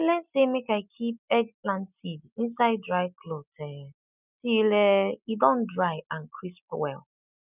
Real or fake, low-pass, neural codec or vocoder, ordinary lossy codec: real; 3.6 kHz; none; none